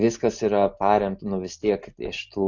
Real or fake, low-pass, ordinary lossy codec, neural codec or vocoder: real; 7.2 kHz; Opus, 64 kbps; none